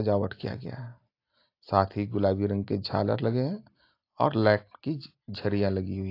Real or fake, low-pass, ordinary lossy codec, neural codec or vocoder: real; 5.4 kHz; AAC, 32 kbps; none